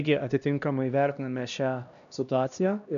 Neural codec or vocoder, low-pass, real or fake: codec, 16 kHz, 1 kbps, X-Codec, HuBERT features, trained on LibriSpeech; 7.2 kHz; fake